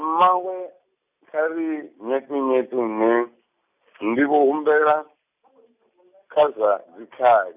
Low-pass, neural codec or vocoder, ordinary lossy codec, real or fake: 3.6 kHz; none; none; real